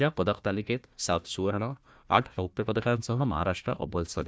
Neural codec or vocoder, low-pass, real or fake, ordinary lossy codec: codec, 16 kHz, 1 kbps, FunCodec, trained on Chinese and English, 50 frames a second; none; fake; none